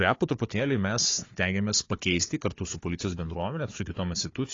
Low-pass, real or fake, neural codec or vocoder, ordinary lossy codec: 7.2 kHz; fake; codec, 16 kHz, 4 kbps, FunCodec, trained on Chinese and English, 50 frames a second; AAC, 32 kbps